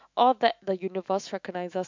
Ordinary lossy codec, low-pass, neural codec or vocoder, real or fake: MP3, 64 kbps; 7.2 kHz; autoencoder, 48 kHz, 128 numbers a frame, DAC-VAE, trained on Japanese speech; fake